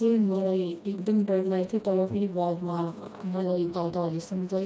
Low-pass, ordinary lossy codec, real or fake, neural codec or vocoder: none; none; fake; codec, 16 kHz, 1 kbps, FreqCodec, smaller model